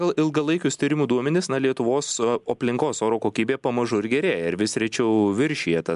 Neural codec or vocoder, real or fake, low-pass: none; real; 10.8 kHz